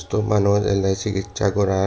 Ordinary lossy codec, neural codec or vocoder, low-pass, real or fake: none; none; none; real